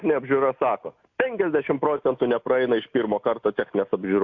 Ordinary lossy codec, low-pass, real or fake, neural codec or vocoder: AAC, 48 kbps; 7.2 kHz; real; none